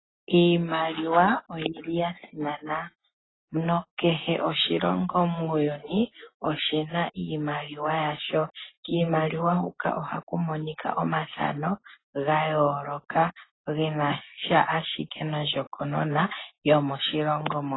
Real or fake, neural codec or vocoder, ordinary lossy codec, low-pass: real; none; AAC, 16 kbps; 7.2 kHz